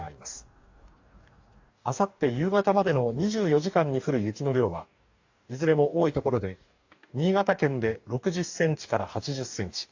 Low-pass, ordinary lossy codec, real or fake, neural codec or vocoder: 7.2 kHz; AAC, 48 kbps; fake; codec, 44.1 kHz, 2.6 kbps, DAC